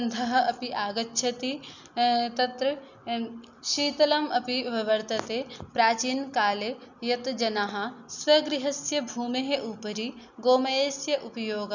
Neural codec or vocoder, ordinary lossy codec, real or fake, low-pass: none; none; real; 7.2 kHz